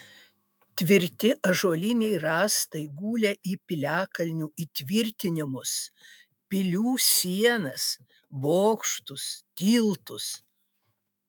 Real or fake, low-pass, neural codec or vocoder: fake; 19.8 kHz; autoencoder, 48 kHz, 128 numbers a frame, DAC-VAE, trained on Japanese speech